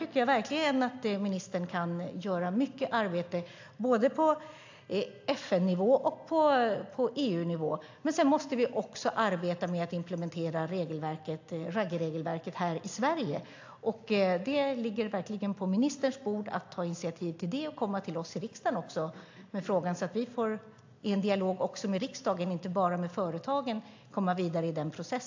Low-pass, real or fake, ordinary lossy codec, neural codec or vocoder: 7.2 kHz; real; AAC, 48 kbps; none